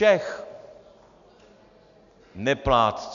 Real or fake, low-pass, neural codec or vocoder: real; 7.2 kHz; none